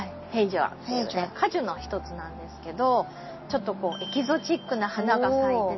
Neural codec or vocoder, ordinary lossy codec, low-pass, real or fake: none; MP3, 24 kbps; 7.2 kHz; real